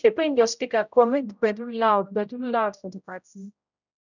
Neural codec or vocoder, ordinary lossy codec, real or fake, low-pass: codec, 16 kHz, 0.5 kbps, X-Codec, HuBERT features, trained on general audio; none; fake; 7.2 kHz